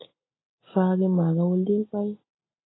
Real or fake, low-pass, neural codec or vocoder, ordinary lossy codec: real; 7.2 kHz; none; AAC, 16 kbps